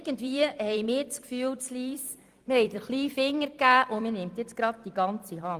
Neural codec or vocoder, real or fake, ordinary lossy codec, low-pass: vocoder, 44.1 kHz, 128 mel bands every 256 samples, BigVGAN v2; fake; Opus, 32 kbps; 14.4 kHz